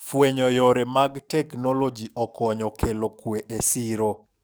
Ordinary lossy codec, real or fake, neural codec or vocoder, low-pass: none; fake; codec, 44.1 kHz, 7.8 kbps, DAC; none